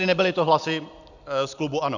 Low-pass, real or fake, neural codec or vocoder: 7.2 kHz; real; none